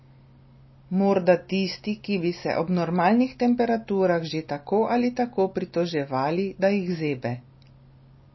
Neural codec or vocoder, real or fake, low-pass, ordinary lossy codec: none; real; 7.2 kHz; MP3, 24 kbps